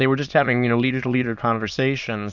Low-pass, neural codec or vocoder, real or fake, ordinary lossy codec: 7.2 kHz; autoencoder, 22.05 kHz, a latent of 192 numbers a frame, VITS, trained on many speakers; fake; Opus, 64 kbps